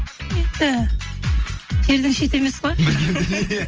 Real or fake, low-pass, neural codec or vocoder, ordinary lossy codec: real; 7.2 kHz; none; Opus, 24 kbps